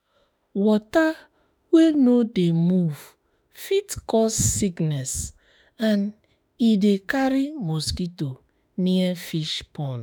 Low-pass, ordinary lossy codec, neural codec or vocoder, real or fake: none; none; autoencoder, 48 kHz, 32 numbers a frame, DAC-VAE, trained on Japanese speech; fake